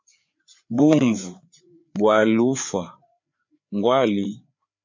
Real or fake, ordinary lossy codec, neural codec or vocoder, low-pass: fake; MP3, 48 kbps; codec, 16 kHz, 4 kbps, FreqCodec, larger model; 7.2 kHz